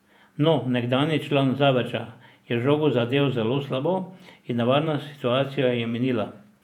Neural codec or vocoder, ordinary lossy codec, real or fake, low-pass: vocoder, 48 kHz, 128 mel bands, Vocos; none; fake; 19.8 kHz